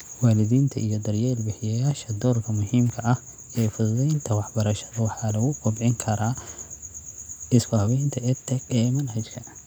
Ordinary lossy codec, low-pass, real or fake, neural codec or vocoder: none; none; real; none